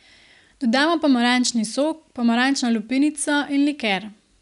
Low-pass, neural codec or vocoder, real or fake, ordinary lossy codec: 10.8 kHz; none; real; none